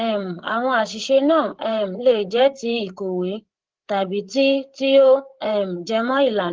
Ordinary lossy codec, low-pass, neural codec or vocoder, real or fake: Opus, 16 kbps; 7.2 kHz; codec, 16 kHz, 8 kbps, FreqCodec, larger model; fake